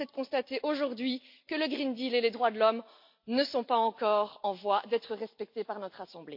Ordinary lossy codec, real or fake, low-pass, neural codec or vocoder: MP3, 32 kbps; real; 5.4 kHz; none